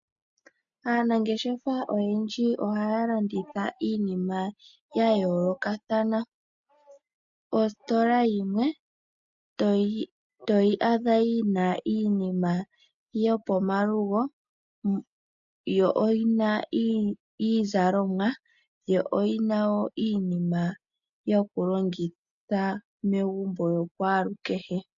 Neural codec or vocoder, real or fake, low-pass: none; real; 7.2 kHz